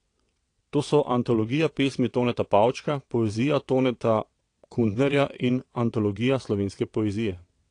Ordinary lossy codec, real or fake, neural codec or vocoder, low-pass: AAC, 48 kbps; fake; vocoder, 22.05 kHz, 80 mel bands, WaveNeXt; 9.9 kHz